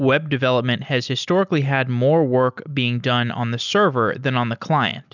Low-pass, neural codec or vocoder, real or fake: 7.2 kHz; none; real